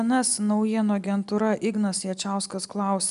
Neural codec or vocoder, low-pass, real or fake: none; 10.8 kHz; real